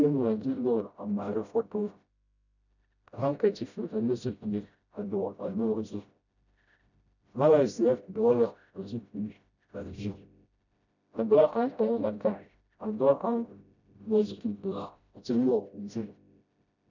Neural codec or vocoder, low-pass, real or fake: codec, 16 kHz, 0.5 kbps, FreqCodec, smaller model; 7.2 kHz; fake